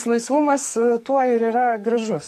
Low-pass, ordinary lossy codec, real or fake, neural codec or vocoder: 14.4 kHz; MP3, 64 kbps; fake; codec, 44.1 kHz, 2.6 kbps, SNAC